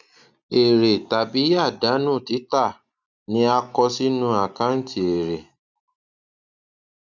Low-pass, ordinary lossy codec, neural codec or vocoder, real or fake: 7.2 kHz; none; none; real